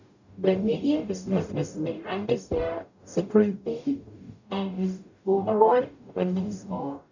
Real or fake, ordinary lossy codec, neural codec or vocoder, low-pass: fake; none; codec, 44.1 kHz, 0.9 kbps, DAC; 7.2 kHz